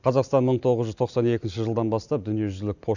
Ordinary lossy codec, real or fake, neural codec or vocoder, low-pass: none; real; none; 7.2 kHz